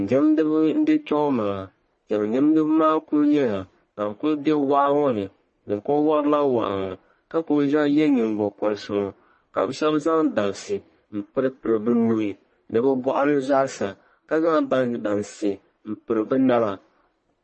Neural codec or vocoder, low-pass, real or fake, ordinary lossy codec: codec, 44.1 kHz, 1.7 kbps, Pupu-Codec; 10.8 kHz; fake; MP3, 32 kbps